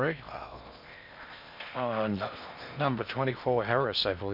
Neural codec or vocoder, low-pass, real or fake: codec, 16 kHz in and 24 kHz out, 0.6 kbps, FocalCodec, streaming, 4096 codes; 5.4 kHz; fake